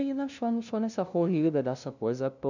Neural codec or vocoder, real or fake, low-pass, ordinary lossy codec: codec, 16 kHz, 0.5 kbps, FunCodec, trained on LibriTTS, 25 frames a second; fake; 7.2 kHz; none